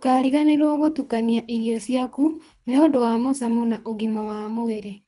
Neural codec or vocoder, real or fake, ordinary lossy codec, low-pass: codec, 24 kHz, 3 kbps, HILCodec; fake; none; 10.8 kHz